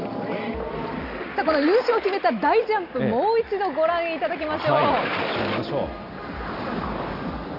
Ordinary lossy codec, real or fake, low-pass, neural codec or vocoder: none; real; 5.4 kHz; none